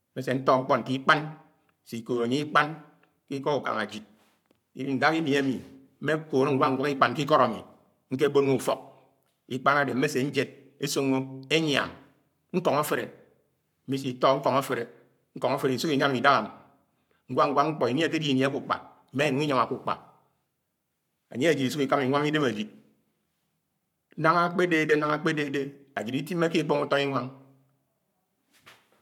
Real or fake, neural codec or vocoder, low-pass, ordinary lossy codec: fake; codec, 44.1 kHz, 7.8 kbps, Pupu-Codec; 19.8 kHz; none